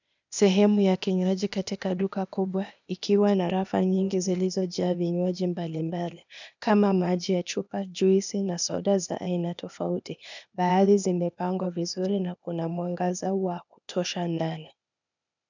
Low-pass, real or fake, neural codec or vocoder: 7.2 kHz; fake; codec, 16 kHz, 0.8 kbps, ZipCodec